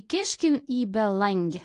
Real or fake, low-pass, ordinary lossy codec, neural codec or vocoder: fake; 10.8 kHz; AAC, 48 kbps; codec, 24 kHz, 0.9 kbps, WavTokenizer, medium speech release version 1